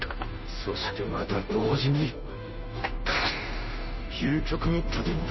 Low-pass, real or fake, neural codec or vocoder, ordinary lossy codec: 7.2 kHz; fake; codec, 16 kHz, 0.5 kbps, FunCodec, trained on Chinese and English, 25 frames a second; MP3, 24 kbps